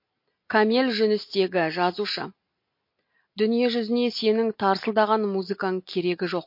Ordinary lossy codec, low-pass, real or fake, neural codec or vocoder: MP3, 32 kbps; 5.4 kHz; real; none